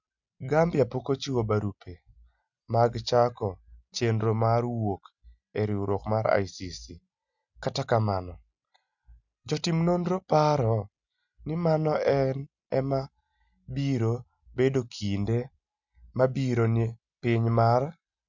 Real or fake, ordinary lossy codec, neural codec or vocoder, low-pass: real; none; none; 7.2 kHz